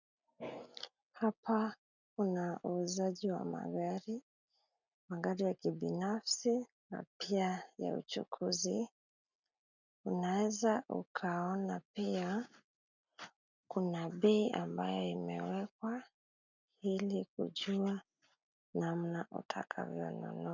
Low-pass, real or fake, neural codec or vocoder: 7.2 kHz; real; none